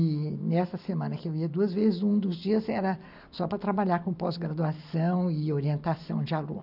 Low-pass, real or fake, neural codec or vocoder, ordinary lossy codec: 5.4 kHz; real; none; none